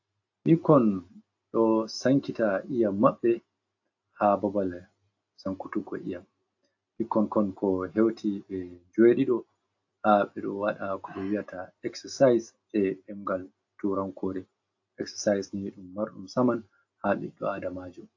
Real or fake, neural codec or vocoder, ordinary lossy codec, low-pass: real; none; AAC, 48 kbps; 7.2 kHz